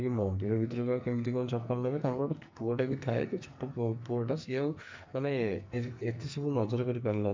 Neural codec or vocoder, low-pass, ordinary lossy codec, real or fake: codec, 44.1 kHz, 2.6 kbps, SNAC; 7.2 kHz; MP3, 64 kbps; fake